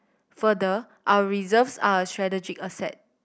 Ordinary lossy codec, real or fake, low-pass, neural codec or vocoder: none; real; none; none